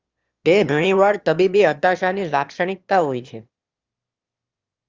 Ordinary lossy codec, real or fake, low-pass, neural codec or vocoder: Opus, 32 kbps; fake; 7.2 kHz; autoencoder, 22.05 kHz, a latent of 192 numbers a frame, VITS, trained on one speaker